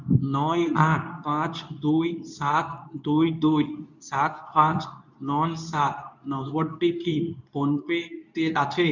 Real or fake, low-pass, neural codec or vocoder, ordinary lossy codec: fake; 7.2 kHz; codec, 24 kHz, 0.9 kbps, WavTokenizer, medium speech release version 2; none